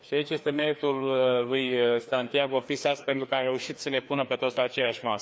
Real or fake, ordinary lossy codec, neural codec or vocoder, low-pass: fake; none; codec, 16 kHz, 2 kbps, FreqCodec, larger model; none